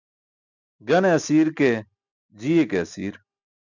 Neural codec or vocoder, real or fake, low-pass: none; real; 7.2 kHz